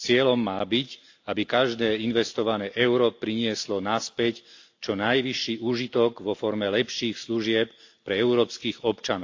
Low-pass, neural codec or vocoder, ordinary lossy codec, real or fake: 7.2 kHz; none; AAC, 48 kbps; real